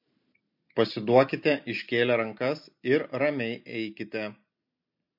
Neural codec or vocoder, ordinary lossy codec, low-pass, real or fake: none; MP3, 32 kbps; 5.4 kHz; real